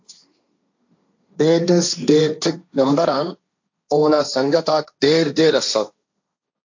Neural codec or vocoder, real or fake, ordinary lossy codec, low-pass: codec, 16 kHz, 1.1 kbps, Voila-Tokenizer; fake; AAC, 48 kbps; 7.2 kHz